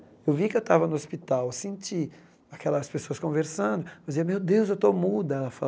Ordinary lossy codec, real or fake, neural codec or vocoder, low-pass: none; real; none; none